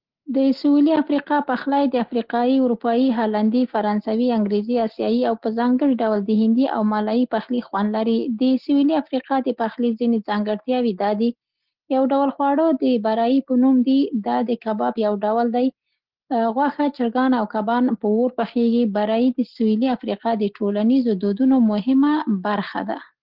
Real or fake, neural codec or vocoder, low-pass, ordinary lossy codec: real; none; 5.4 kHz; Opus, 16 kbps